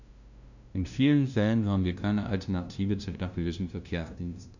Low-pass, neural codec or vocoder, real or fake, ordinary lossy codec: 7.2 kHz; codec, 16 kHz, 0.5 kbps, FunCodec, trained on LibriTTS, 25 frames a second; fake; none